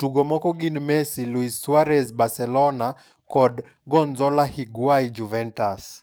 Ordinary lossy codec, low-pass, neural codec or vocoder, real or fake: none; none; codec, 44.1 kHz, 7.8 kbps, DAC; fake